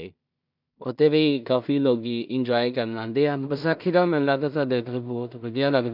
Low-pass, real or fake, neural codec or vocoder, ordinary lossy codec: 5.4 kHz; fake; codec, 16 kHz in and 24 kHz out, 0.4 kbps, LongCat-Audio-Codec, two codebook decoder; none